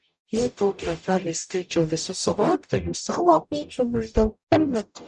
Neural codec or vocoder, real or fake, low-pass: codec, 44.1 kHz, 0.9 kbps, DAC; fake; 10.8 kHz